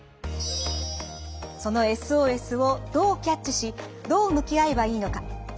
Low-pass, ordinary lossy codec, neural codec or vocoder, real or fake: none; none; none; real